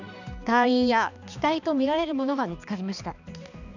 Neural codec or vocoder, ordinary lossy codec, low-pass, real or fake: codec, 16 kHz, 2 kbps, X-Codec, HuBERT features, trained on balanced general audio; none; 7.2 kHz; fake